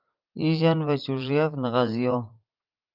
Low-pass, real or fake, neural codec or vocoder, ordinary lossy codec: 5.4 kHz; fake; vocoder, 44.1 kHz, 80 mel bands, Vocos; Opus, 24 kbps